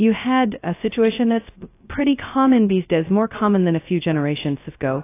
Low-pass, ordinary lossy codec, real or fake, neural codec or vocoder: 3.6 kHz; AAC, 24 kbps; fake; codec, 16 kHz, 0.2 kbps, FocalCodec